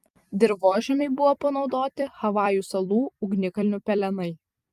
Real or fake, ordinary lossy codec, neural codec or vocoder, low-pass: fake; Opus, 32 kbps; vocoder, 44.1 kHz, 128 mel bands every 256 samples, BigVGAN v2; 14.4 kHz